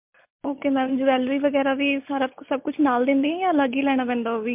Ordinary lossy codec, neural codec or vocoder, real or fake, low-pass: MP3, 32 kbps; none; real; 3.6 kHz